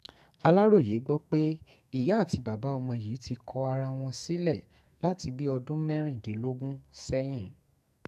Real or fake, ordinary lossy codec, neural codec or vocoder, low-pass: fake; none; codec, 44.1 kHz, 2.6 kbps, SNAC; 14.4 kHz